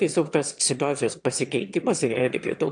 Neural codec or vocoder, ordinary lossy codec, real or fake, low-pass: autoencoder, 22.05 kHz, a latent of 192 numbers a frame, VITS, trained on one speaker; AAC, 64 kbps; fake; 9.9 kHz